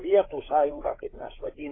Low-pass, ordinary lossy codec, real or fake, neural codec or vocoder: 7.2 kHz; AAC, 16 kbps; fake; codec, 16 kHz, 4 kbps, FreqCodec, larger model